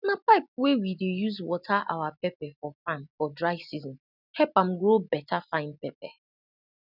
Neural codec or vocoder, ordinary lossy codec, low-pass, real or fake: none; none; 5.4 kHz; real